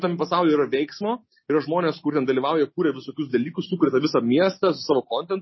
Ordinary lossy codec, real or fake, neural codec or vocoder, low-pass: MP3, 24 kbps; real; none; 7.2 kHz